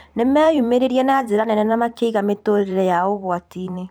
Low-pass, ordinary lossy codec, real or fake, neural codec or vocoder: none; none; fake; vocoder, 44.1 kHz, 128 mel bands every 512 samples, BigVGAN v2